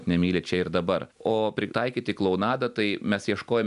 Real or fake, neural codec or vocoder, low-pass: real; none; 10.8 kHz